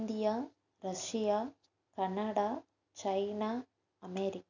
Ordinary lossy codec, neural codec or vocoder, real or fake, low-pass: none; none; real; 7.2 kHz